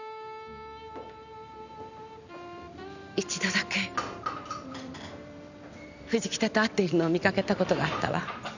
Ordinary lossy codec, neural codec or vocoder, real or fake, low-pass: none; vocoder, 44.1 kHz, 128 mel bands every 512 samples, BigVGAN v2; fake; 7.2 kHz